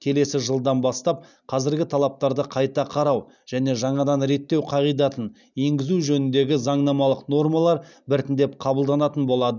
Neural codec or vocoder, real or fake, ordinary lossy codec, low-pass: none; real; none; 7.2 kHz